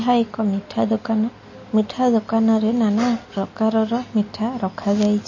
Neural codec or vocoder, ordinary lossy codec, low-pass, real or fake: none; MP3, 32 kbps; 7.2 kHz; real